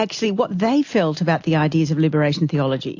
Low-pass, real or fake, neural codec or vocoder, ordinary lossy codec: 7.2 kHz; real; none; AAC, 48 kbps